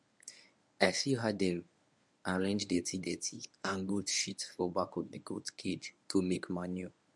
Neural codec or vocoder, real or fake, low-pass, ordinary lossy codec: codec, 24 kHz, 0.9 kbps, WavTokenizer, medium speech release version 1; fake; none; none